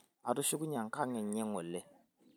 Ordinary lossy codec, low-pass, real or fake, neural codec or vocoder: none; none; fake; vocoder, 44.1 kHz, 128 mel bands every 256 samples, BigVGAN v2